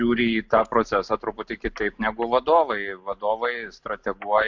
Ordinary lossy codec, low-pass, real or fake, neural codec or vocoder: MP3, 64 kbps; 7.2 kHz; real; none